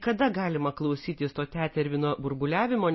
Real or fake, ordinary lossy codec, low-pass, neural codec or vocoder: real; MP3, 24 kbps; 7.2 kHz; none